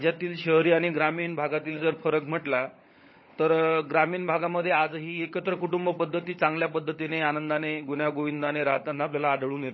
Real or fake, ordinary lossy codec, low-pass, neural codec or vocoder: fake; MP3, 24 kbps; 7.2 kHz; codec, 16 kHz, 16 kbps, FunCodec, trained on LibriTTS, 50 frames a second